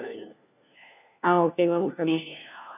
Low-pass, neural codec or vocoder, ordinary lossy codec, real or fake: 3.6 kHz; codec, 16 kHz, 1 kbps, FunCodec, trained on LibriTTS, 50 frames a second; none; fake